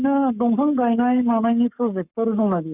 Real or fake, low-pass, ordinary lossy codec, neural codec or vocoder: fake; 3.6 kHz; none; codec, 44.1 kHz, 7.8 kbps, Pupu-Codec